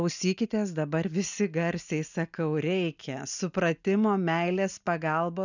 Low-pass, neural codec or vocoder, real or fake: 7.2 kHz; none; real